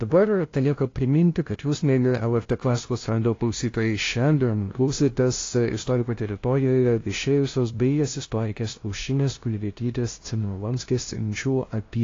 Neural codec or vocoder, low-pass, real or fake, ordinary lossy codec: codec, 16 kHz, 0.5 kbps, FunCodec, trained on LibriTTS, 25 frames a second; 7.2 kHz; fake; AAC, 32 kbps